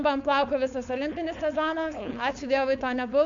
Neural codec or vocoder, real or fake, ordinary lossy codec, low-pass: codec, 16 kHz, 4.8 kbps, FACodec; fake; AAC, 64 kbps; 7.2 kHz